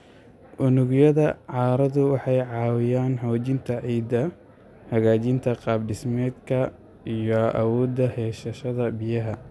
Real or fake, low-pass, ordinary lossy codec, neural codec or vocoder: real; none; none; none